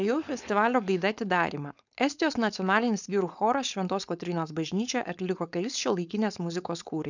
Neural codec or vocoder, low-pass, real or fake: codec, 16 kHz, 4.8 kbps, FACodec; 7.2 kHz; fake